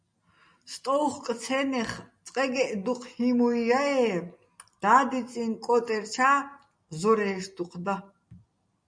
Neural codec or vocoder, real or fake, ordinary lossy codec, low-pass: none; real; MP3, 96 kbps; 9.9 kHz